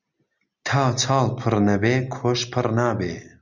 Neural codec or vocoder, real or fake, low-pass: none; real; 7.2 kHz